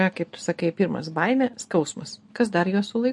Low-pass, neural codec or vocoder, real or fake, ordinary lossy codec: 10.8 kHz; none; real; MP3, 48 kbps